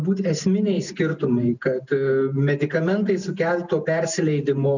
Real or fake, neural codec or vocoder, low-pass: real; none; 7.2 kHz